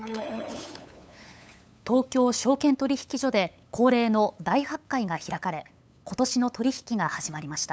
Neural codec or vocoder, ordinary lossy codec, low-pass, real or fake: codec, 16 kHz, 8 kbps, FunCodec, trained on LibriTTS, 25 frames a second; none; none; fake